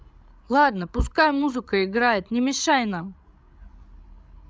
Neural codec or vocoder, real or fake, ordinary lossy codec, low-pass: codec, 16 kHz, 16 kbps, FreqCodec, larger model; fake; none; none